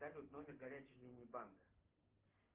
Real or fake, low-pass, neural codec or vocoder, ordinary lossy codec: real; 3.6 kHz; none; Opus, 16 kbps